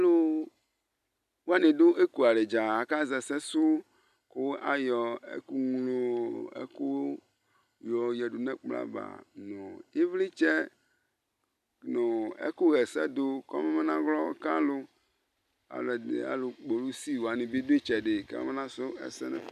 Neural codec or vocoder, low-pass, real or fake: none; 14.4 kHz; real